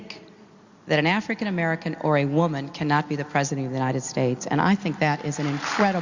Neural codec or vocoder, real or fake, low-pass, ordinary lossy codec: none; real; 7.2 kHz; Opus, 64 kbps